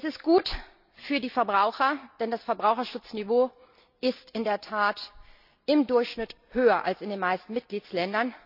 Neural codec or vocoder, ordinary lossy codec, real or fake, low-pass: none; none; real; 5.4 kHz